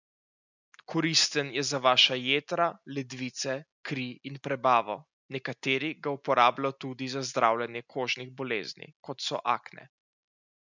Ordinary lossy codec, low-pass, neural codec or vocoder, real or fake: none; 7.2 kHz; none; real